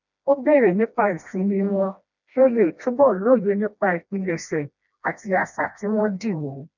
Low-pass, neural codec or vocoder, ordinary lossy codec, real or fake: 7.2 kHz; codec, 16 kHz, 1 kbps, FreqCodec, smaller model; none; fake